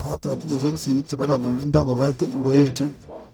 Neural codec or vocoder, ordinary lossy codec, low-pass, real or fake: codec, 44.1 kHz, 0.9 kbps, DAC; none; none; fake